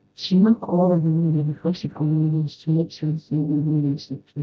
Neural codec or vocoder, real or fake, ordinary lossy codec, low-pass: codec, 16 kHz, 0.5 kbps, FreqCodec, smaller model; fake; none; none